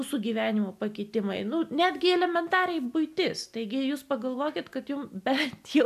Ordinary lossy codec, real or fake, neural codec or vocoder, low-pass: AAC, 96 kbps; real; none; 14.4 kHz